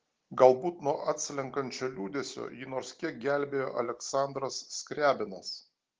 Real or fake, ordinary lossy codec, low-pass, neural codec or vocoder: real; Opus, 16 kbps; 7.2 kHz; none